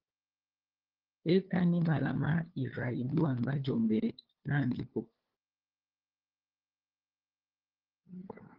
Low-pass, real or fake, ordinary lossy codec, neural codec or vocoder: 5.4 kHz; fake; Opus, 16 kbps; codec, 16 kHz, 2 kbps, FunCodec, trained on LibriTTS, 25 frames a second